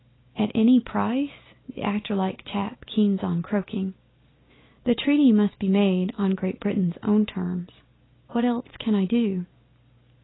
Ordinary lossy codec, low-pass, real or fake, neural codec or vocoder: AAC, 16 kbps; 7.2 kHz; real; none